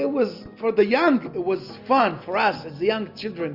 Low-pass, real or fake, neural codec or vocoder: 5.4 kHz; real; none